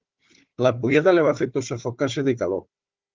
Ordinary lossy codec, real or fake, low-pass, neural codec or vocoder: Opus, 32 kbps; fake; 7.2 kHz; codec, 16 kHz, 4 kbps, FunCodec, trained on Chinese and English, 50 frames a second